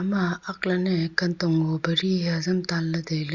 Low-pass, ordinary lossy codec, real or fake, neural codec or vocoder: 7.2 kHz; Opus, 64 kbps; real; none